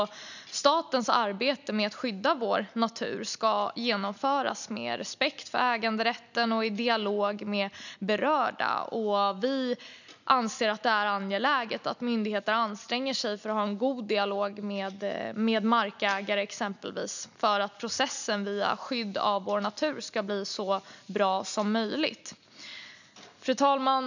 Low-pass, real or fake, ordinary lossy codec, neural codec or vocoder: 7.2 kHz; real; none; none